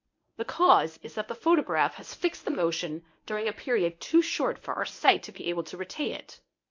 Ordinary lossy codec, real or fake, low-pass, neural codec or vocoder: MP3, 48 kbps; fake; 7.2 kHz; codec, 24 kHz, 0.9 kbps, WavTokenizer, medium speech release version 1